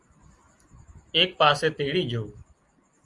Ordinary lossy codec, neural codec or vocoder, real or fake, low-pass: Opus, 32 kbps; none; real; 10.8 kHz